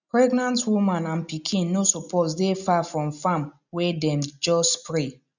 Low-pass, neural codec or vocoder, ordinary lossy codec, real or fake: 7.2 kHz; none; none; real